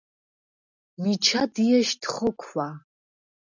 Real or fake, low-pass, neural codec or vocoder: real; 7.2 kHz; none